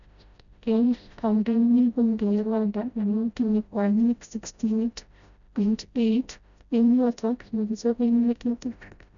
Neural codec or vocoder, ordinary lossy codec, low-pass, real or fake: codec, 16 kHz, 0.5 kbps, FreqCodec, smaller model; none; 7.2 kHz; fake